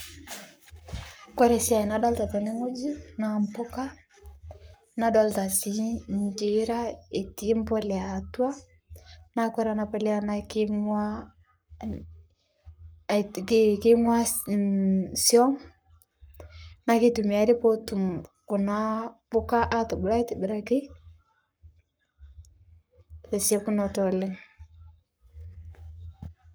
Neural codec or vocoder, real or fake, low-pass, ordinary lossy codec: codec, 44.1 kHz, 7.8 kbps, Pupu-Codec; fake; none; none